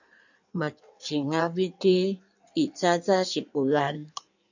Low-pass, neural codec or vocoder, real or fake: 7.2 kHz; codec, 16 kHz in and 24 kHz out, 1.1 kbps, FireRedTTS-2 codec; fake